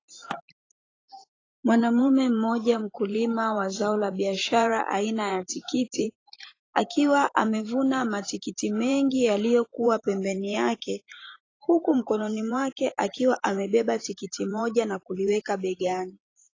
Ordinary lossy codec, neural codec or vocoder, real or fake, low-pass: AAC, 32 kbps; vocoder, 44.1 kHz, 128 mel bands every 512 samples, BigVGAN v2; fake; 7.2 kHz